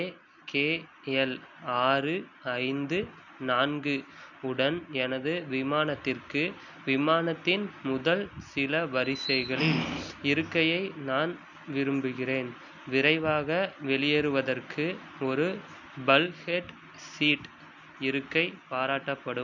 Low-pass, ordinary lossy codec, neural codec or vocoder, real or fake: 7.2 kHz; none; none; real